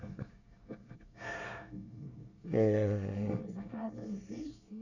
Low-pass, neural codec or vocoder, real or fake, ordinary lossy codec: 7.2 kHz; codec, 24 kHz, 1 kbps, SNAC; fake; none